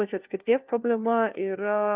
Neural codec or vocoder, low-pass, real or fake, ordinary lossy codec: codec, 16 kHz, 1 kbps, FunCodec, trained on LibriTTS, 50 frames a second; 3.6 kHz; fake; Opus, 24 kbps